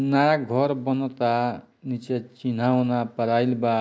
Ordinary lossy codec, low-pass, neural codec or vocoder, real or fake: none; none; none; real